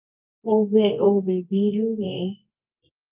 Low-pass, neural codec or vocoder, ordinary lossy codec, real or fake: 3.6 kHz; codec, 24 kHz, 0.9 kbps, WavTokenizer, medium music audio release; Opus, 24 kbps; fake